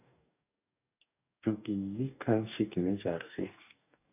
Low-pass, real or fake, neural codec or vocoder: 3.6 kHz; fake; codec, 44.1 kHz, 2.6 kbps, DAC